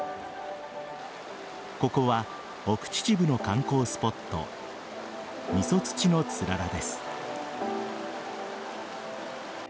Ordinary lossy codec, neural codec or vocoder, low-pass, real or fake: none; none; none; real